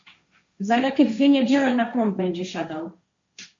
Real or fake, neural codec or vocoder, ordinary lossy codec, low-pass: fake; codec, 16 kHz, 1.1 kbps, Voila-Tokenizer; MP3, 48 kbps; 7.2 kHz